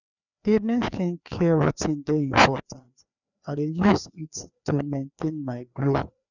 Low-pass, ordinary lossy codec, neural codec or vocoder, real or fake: 7.2 kHz; none; codec, 16 kHz, 2 kbps, FreqCodec, larger model; fake